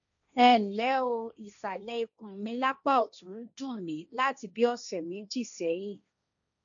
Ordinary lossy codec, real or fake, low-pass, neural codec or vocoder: none; fake; 7.2 kHz; codec, 16 kHz, 1.1 kbps, Voila-Tokenizer